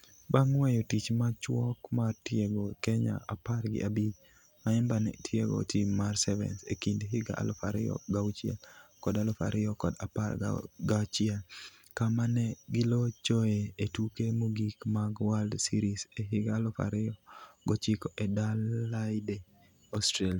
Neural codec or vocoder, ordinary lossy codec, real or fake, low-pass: none; none; real; 19.8 kHz